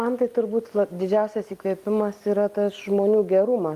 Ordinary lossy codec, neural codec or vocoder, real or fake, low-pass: Opus, 32 kbps; none; real; 14.4 kHz